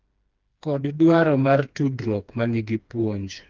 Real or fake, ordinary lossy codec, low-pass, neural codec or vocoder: fake; Opus, 32 kbps; 7.2 kHz; codec, 16 kHz, 2 kbps, FreqCodec, smaller model